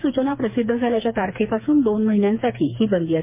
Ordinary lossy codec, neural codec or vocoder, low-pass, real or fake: MP3, 24 kbps; codec, 16 kHz, 4 kbps, FreqCodec, smaller model; 3.6 kHz; fake